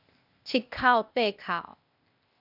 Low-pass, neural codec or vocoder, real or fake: 5.4 kHz; codec, 16 kHz, 0.8 kbps, ZipCodec; fake